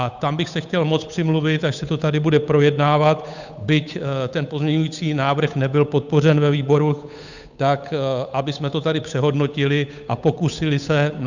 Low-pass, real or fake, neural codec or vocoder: 7.2 kHz; fake; codec, 16 kHz, 8 kbps, FunCodec, trained on Chinese and English, 25 frames a second